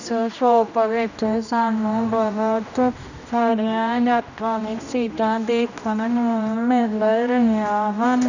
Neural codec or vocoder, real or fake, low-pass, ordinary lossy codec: codec, 16 kHz, 1 kbps, X-Codec, HuBERT features, trained on general audio; fake; 7.2 kHz; none